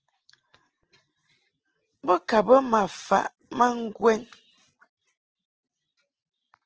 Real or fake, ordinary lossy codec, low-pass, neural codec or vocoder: real; Opus, 16 kbps; 7.2 kHz; none